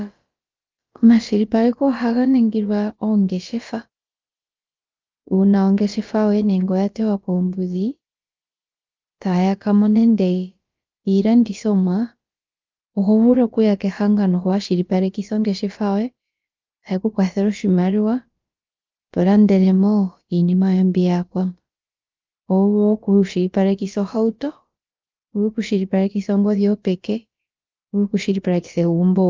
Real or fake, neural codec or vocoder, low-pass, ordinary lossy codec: fake; codec, 16 kHz, about 1 kbps, DyCAST, with the encoder's durations; 7.2 kHz; Opus, 32 kbps